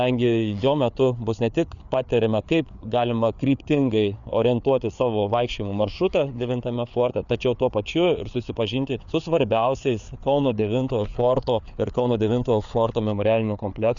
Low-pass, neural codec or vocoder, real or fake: 7.2 kHz; codec, 16 kHz, 4 kbps, FreqCodec, larger model; fake